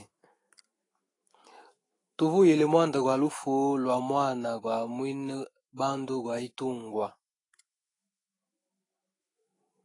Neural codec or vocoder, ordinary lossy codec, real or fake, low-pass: autoencoder, 48 kHz, 128 numbers a frame, DAC-VAE, trained on Japanese speech; AAC, 32 kbps; fake; 10.8 kHz